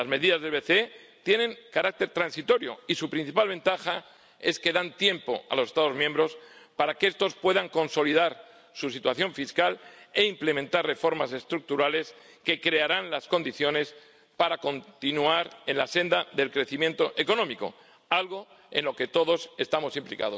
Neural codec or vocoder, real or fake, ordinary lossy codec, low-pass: none; real; none; none